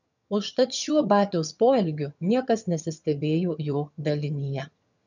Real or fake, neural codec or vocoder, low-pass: fake; vocoder, 22.05 kHz, 80 mel bands, HiFi-GAN; 7.2 kHz